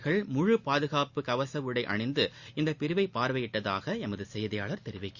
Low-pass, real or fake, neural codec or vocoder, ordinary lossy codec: 7.2 kHz; real; none; Opus, 64 kbps